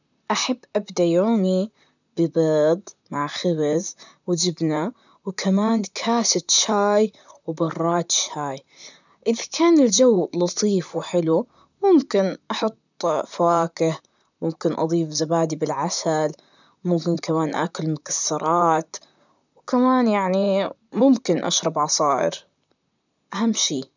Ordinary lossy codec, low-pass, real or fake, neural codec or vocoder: none; 7.2 kHz; fake; vocoder, 44.1 kHz, 128 mel bands, Pupu-Vocoder